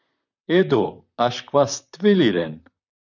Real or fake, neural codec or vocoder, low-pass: fake; vocoder, 44.1 kHz, 128 mel bands, Pupu-Vocoder; 7.2 kHz